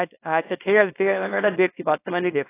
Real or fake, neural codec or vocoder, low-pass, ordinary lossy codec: fake; codec, 24 kHz, 0.9 kbps, WavTokenizer, small release; 3.6 kHz; AAC, 16 kbps